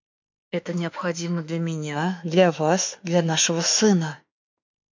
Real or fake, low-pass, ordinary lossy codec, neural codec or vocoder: fake; 7.2 kHz; MP3, 48 kbps; autoencoder, 48 kHz, 32 numbers a frame, DAC-VAE, trained on Japanese speech